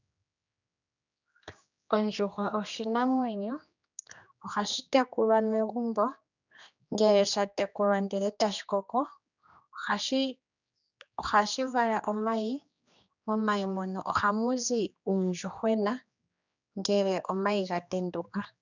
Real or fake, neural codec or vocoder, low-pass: fake; codec, 16 kHz, 2 kbps, X-Codec, HuBERT features, trained on general audio; 7.2 kHz